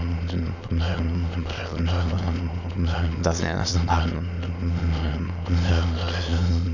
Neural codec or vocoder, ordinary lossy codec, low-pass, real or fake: autoencoder, 22.05 kHz, a latent of 192 numbers a frame, VITS, trained on many speakers; none; 7.2 kHz; fake